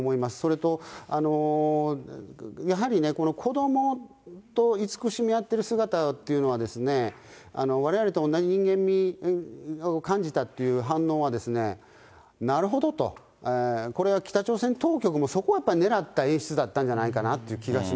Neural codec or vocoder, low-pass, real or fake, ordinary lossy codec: none; none; real; none